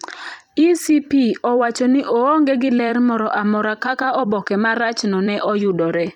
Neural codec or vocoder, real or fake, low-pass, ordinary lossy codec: vocoder, 44.1 kHz, 128 mel bands every 256 samples, BigVGAN v2; fake; 19.8 kHz; none